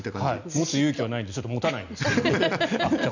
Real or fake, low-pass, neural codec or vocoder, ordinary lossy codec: real; 7.2 kHz; none; none